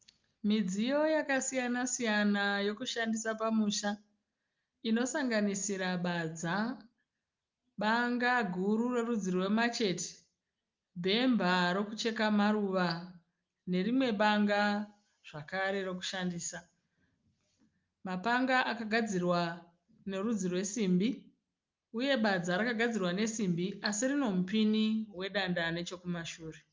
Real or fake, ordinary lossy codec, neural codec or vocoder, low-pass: real; Opus, 24 kbps; none; 7.2 kHz